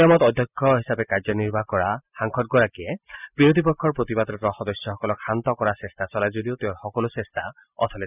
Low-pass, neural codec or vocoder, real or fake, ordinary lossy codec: 3.6 kHz; none; real; none